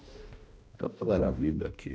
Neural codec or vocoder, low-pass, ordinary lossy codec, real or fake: codec, 16 kHz, 1 kbps, X-Codec, HuBERT features, trained on balanced general audio; none; none; fake